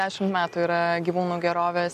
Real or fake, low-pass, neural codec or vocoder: real; 14.4 kHz; none